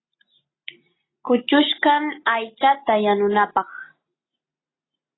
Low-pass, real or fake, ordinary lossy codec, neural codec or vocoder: 7.2 kHz; real; AAC, 16 kbps; none